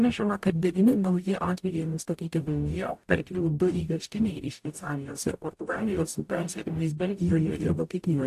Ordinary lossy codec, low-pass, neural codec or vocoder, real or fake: AAC, 64 kbps; 14.4 kHz; codec, 44.1 kHz, 0.9 kbps, DAC; fake